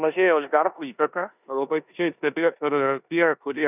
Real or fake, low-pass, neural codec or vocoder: fake; 3.6 kHz; codec, 16 kHz in and 24 kHz out, 0.9 kbps, LongCat-Audio-Codec, four codebook decoder